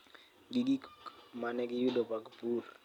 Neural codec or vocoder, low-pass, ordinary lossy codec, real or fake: none; 19.8 kHz; none; real